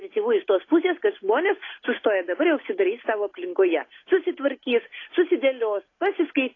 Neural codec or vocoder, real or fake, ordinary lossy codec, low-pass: none; real; AAC, 32 kbps; 7.2 kHz